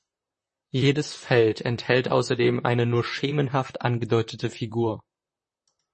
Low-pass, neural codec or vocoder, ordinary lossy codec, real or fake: 10.8 kHz; vocoder, 44.1 kHz, 128 mel bands, Pupu-Vocoder; MP3, 32 kbps; fake